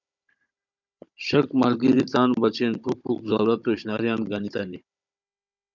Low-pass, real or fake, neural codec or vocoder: 7.2 kHz; fake; codec, 16 kHz, 16 kbps, FunCodec, trained on Chinese and English, 50 frames a second